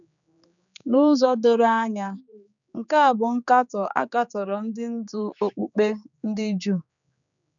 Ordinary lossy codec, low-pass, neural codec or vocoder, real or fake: none; 7.2 kHz; codec, 16 kHz, 4 kbps, X-Codec, HuBERT features, trained on general audio; fake